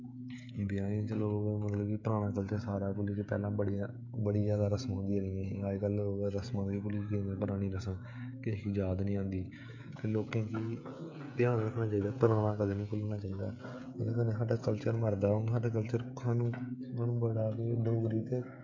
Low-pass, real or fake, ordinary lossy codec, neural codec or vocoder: 7.2 kHz; fake; MP3, 48 kbps; codec, 16 kHz, 16 kbps, FreqCodec, smaller model